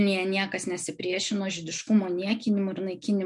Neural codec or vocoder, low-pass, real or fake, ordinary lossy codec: none; 10.8 kHz; real; MP3, 64 kbps